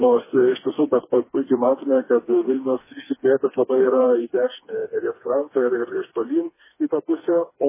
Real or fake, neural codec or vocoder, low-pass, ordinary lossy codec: fake; codec, 16 kHz, 2 kbps, FreqCodec, smaller model; 3.6 kHz; MP3, 16 kbps